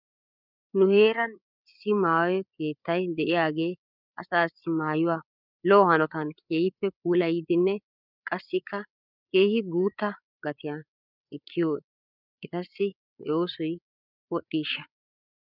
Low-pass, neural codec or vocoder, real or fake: 5.4 kHz; codec, 16 kHz, 8 kbps, FreqCodec, larger model; fake